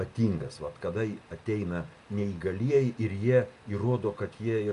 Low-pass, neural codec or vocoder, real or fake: 10.8 kHz; none; real